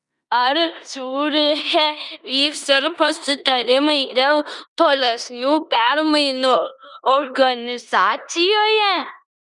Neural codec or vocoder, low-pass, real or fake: codec, 16 kHz in and 24 kHz out, 0.9 kbps, LongCat-Audio-Codec, four codebook decoder; 10.8 kHz; fake